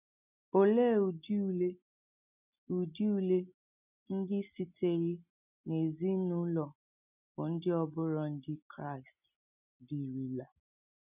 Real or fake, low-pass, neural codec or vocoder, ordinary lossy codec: real; 3.6 kHz; none; none